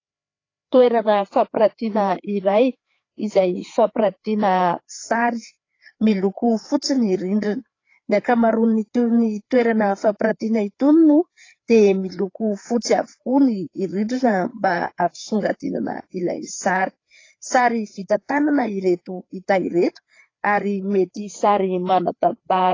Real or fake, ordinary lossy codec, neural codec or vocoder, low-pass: fake; AAC, 32 kbps; codec, 16 kHz, 4 kbps, FreqCodec, larger model; 7.2 kHz